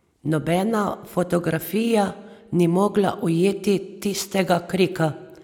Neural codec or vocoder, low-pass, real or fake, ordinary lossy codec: none; 19.8 kHz; real; none